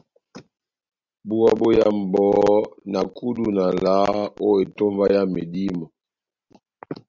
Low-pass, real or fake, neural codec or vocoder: 7.2 kHz; real; none